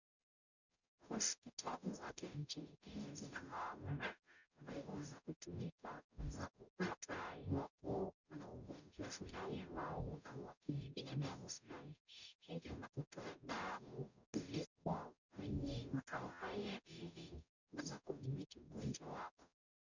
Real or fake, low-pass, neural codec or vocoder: fake; 7.2 kHz; codec, 44.1 kHz, 0.9 kbps, DAC